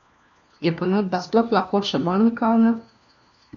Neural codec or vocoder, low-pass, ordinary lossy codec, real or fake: codec, 16 kHz, 1 kbps, FunCodec, trained on LibriTTS, 50 frames a second; 7.2 kHz; none; fake